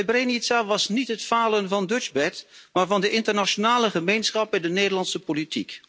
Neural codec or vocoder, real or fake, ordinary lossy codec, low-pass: none; real; none; none